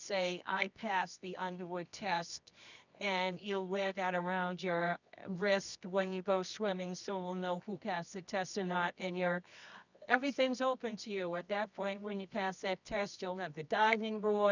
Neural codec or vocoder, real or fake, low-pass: codec, 24 kHz, 0.9 kbps, WavTokenizer, medium music audio release; fake; 7.2 kHz